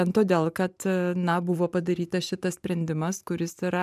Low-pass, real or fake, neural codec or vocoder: 14.4 kHz; fake; vocoder, 44.1 kHz, 128 mel bands every 512 samples, BigVGAN v2